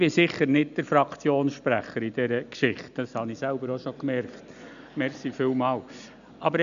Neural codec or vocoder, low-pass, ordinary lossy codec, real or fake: none; 7.2 kHz; none; real